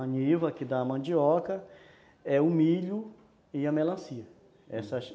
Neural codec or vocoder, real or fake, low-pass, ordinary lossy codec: none; real; none; none